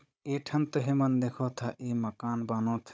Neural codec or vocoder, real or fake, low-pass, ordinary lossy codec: codec, 16 kHz, 16 kbps, FunCodec, trained on Chinese and English, 50 frames a second; fake; none; none